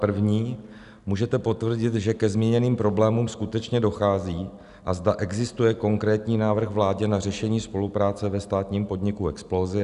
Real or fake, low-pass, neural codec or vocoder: real; 10.8 kHz; none